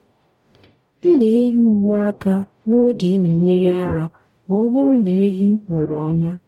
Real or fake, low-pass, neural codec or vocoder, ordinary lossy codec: fake; 19.8 kHz; codec, 44.1 kHz, 0.9 kbps, DAC; MP3, 64 kbps